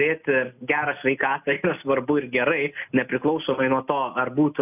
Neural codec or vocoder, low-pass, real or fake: none; 3.6 kHz; real